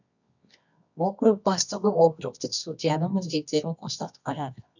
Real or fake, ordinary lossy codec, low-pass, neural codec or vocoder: fake; none; 7.2 kHz; codec, 24 kHz, 0.9 kbps, WavTokenizer, medium music audio release